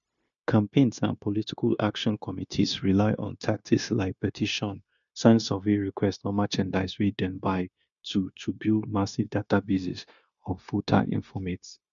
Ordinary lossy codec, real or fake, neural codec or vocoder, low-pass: none; fake; codec, 16 kHz, 0.9 kbps, LongCat-Audio-Codec; 7.2 kHz